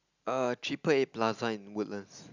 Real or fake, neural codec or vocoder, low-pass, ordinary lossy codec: real; none; 7.2 kHz; none